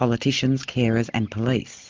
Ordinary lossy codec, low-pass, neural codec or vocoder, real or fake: Opus, 24 kbps; 7.2 kHz; none; real